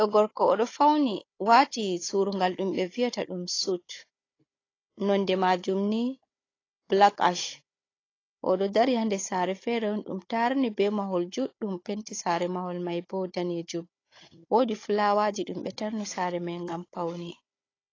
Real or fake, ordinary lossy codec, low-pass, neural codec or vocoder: fake; AAC, 32 kbps; 7.2 kHz; codec, 16 kHz, 16 kbps, FunCodec, trained on Chinese and English, 50 frames a second